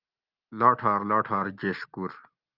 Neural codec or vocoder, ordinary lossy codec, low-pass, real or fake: none; Opus, 32 kbps; 5.4 kHz; real